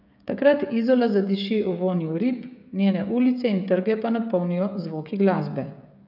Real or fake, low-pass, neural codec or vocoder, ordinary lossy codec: fake; 5.4 kHz; codec, 16 kHz, 16 kbps, FreqCodec, smaller model; none